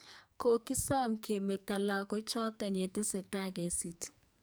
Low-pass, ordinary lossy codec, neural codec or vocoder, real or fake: none; none; codec, 44.1 kHz, 2.6 kbps, SNAC; fake